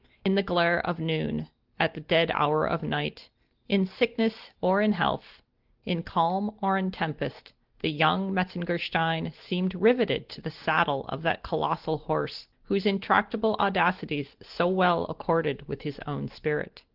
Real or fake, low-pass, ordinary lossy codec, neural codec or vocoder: real; 5.4 kHz; Opus, 16 kbps; none